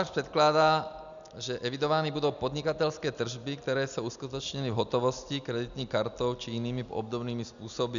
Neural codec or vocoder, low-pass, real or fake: none; 7.2 kHz; real